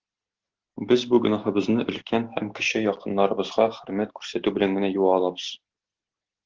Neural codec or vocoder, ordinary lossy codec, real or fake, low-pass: none; Opus, 16 kbps; real; 7.2 kHz